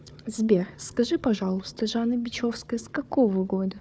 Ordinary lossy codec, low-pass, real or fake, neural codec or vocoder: none; none; fake; codec, 16 kHz, 4 kbps, FunCodec, trained on Chinese and English, 50 frames a second